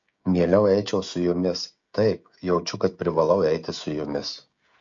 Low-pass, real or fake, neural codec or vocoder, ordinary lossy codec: 7.2 kHz; fake; codec, 16 kHz, 16 kbps, FreqCodec, smaller model; MP3, 48 kbps